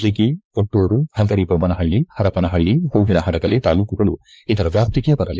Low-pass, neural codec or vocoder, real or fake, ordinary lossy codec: none; codec, 16 kHz, 4 kbps, X-Codec, WavLM features, trained on Multilingual LibriSpeech; fake; none